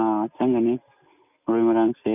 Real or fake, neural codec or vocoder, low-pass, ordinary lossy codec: real; none; 3.6 kHz; none